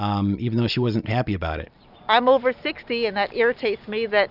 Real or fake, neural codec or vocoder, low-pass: real; none; 5.4 kHz